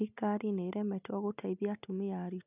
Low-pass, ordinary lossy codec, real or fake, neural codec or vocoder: 3.6 kHz; none; real; none